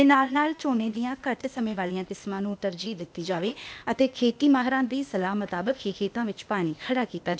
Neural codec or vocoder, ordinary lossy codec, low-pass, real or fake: codec, 16 kHz, 0.8 kbps, ZipCodec; none; none; fake